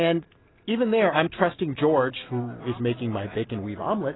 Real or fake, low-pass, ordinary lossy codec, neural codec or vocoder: real; 7.2 kHz; AAC, 16 kbps; none